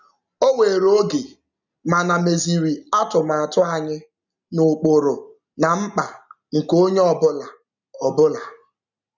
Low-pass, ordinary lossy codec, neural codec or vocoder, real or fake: 7.2 kHz; none; none; real